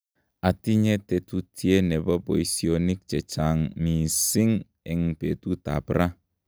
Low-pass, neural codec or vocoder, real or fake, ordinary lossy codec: none; none; real; none